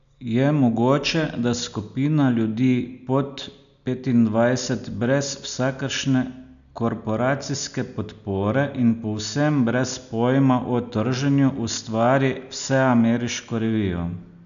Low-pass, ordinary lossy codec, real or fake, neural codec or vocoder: 7.2 kHz; none; real; none